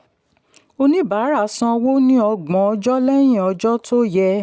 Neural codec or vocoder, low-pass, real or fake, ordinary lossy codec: none; none; real; none